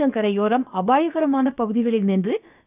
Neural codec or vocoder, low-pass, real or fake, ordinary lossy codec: codec, 16 kHz, about 1 kbps, DyCAST, with the encoder's durations; 3.6 kHz; fake; none